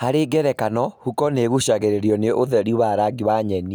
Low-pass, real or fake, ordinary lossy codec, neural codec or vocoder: none; real; none; none